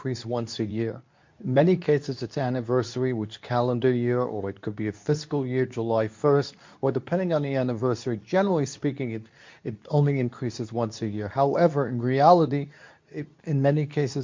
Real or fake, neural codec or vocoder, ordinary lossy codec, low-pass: fake; codec, 24 kHz, 0.9 kbps, WavTokenizer, medium speech release version 2; MP3, 48 kbps; 7.2 kHz